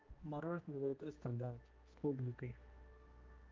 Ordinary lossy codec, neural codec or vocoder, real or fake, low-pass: Opus, 32 kbps; codec, 16 kHz, 1 kbps, X-Codec, HuBERT features, trained on general audio; fake; 7.2 kHz